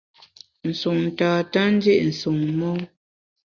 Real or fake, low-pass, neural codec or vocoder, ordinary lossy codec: real; 7.2 kHz; none; Opus, 64 kbps